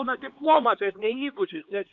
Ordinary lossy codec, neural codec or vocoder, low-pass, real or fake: AAC, 64 kbps; codec, 16 kHz, 2 kbps, X-Codec, HuBERT features, trained on LibriSpeech; 7.2 kHz; fake